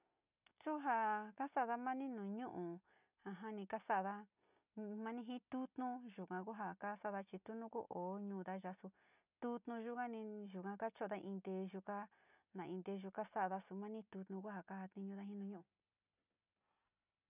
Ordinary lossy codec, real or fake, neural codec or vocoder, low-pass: none; real; none; 3.6 kHz